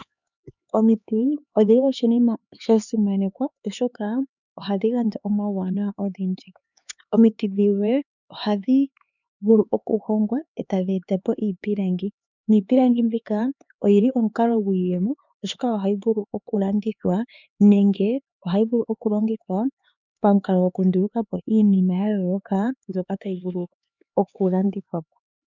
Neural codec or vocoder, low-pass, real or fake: codec, 16 kHz, 4 kbps, X-Codec, HuBERT features, trained on LibriSpeech; 7.2 kHz; fake